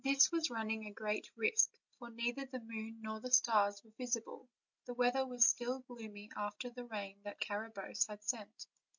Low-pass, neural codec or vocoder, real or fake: 7.2 kHz; none; real